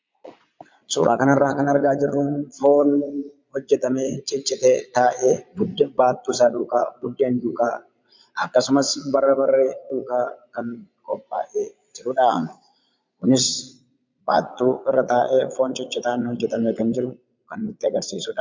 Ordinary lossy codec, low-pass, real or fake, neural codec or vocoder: MP3, 64 kbps; 7.2 kHz; fake; vocoder, 22.05 kHz, 80 mel bands, Vocos